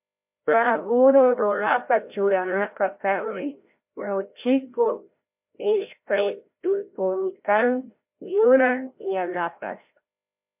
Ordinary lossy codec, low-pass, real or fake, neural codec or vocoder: MP3, 32 kbps; 3.6 kHz; fake; codec, 16 kHz, 0.5 kbps, FreqCodec, larger model